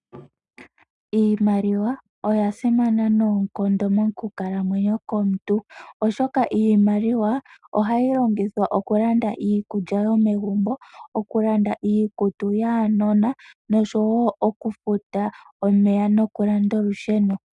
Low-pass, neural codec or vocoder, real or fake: 10.8 kHz; none; real